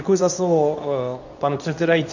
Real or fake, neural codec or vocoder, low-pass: fake; codec, 24 kHz, 0.9 kbps, WavTokenizer, medium speech release version 2; 7.2 kHz